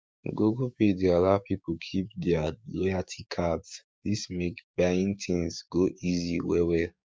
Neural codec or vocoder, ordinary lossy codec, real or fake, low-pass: codec, 16 kHz, 6 kbps, DAC; none; fake; none